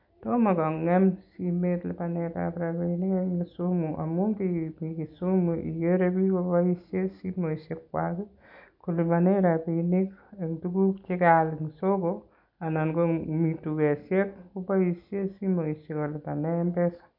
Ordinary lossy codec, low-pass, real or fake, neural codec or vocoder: none; 5.4 kHz; real; none